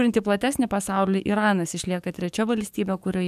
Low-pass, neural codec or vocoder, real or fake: 14.4 kHz; codec, 44.1 kHz, 7.8 kbps, DAC; fake